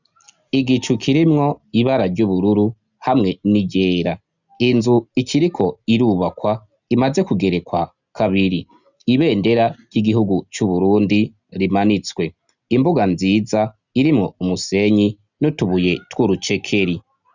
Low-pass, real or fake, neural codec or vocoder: 7.2 kHz; real; none